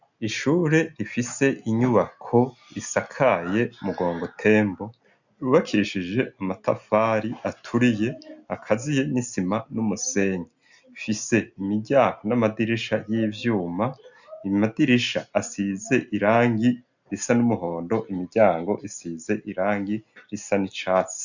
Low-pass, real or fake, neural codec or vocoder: 7.2 kHz; real; none